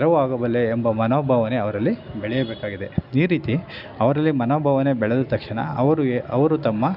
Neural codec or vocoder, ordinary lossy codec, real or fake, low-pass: none; none; real; 5.4 kHz